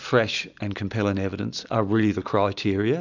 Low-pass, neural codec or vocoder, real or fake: 7.2 kHz; none; real